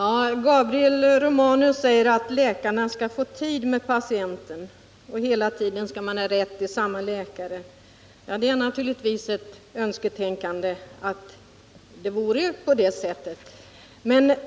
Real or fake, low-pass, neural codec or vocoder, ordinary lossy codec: real; none; none; none